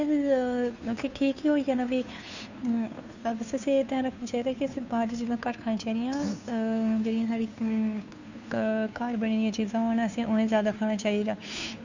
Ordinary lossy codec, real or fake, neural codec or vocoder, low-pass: none; fake; codec, 16 kHz, 2 kbps, FunCodec, trained on Chinese and English, 25 frames a second; 7.2 kHz